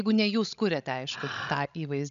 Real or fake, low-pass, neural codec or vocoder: fake; 7.2 kHz; codec, 16 kHz, 16 kbps, FreqCodec, larger model